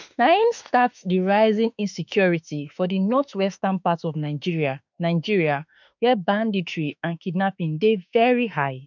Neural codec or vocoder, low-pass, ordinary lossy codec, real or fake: autoencoder, 48 kHz, 32 numbers a frame, DAC-VAE, trained on Japanese speech; 7.2 kHz; none; fake